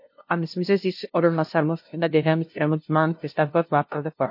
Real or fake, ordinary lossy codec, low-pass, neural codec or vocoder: fake; MP3, 32 kbps; 5.4 kHz; codec, 16 kHz, 0.5 kbps, FunCodec, trained on LibriTTS, 25 frames a second